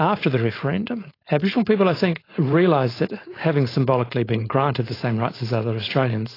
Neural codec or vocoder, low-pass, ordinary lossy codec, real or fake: codec, 16 kHz, 4.8 kbps, FACodec; 5.4 kHz; AAC, 24 kbps; fake